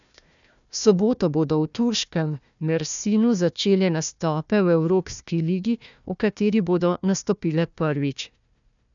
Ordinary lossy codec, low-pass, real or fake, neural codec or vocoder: none; 7.2 kHz; fake; codec, 16 kHz, 1 kbps, FunCodec, trained on Chinese and English, 50 frames a second